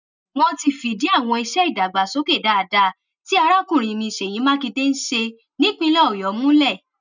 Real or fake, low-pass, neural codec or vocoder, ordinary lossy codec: real; 7.2 kHz; none; none